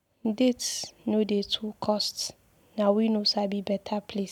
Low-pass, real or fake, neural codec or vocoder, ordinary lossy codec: 19.8 kHz; real; none; none